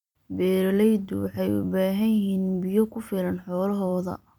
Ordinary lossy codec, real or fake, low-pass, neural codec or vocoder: none; fake; 19.8 kHz; vocoder, 44.1 kHz, 128 mel bands every 256 samples, BigVGAN v2